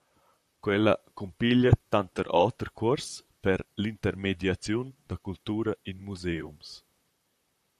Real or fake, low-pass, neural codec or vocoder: fake; 14.4 kHz; vocoder, 44.1 kHz, 128 mel bands, Pupu-Vocoder